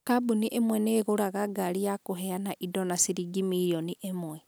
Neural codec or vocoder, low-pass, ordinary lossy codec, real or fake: none; none; none; real